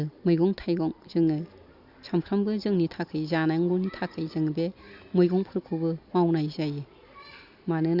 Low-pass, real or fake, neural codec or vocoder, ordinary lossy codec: 5.4 kHz; real; none; Opus, 64 kbps